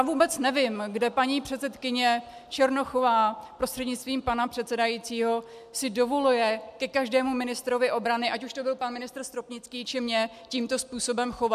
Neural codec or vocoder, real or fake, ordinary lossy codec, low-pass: none; real; MP3, 96 kbps; 14.4 kHz